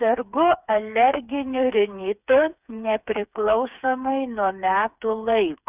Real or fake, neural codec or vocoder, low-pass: fake; codec, 16 kHz, 4 kbps, FreqCodec, smaller model; 3.6 kHz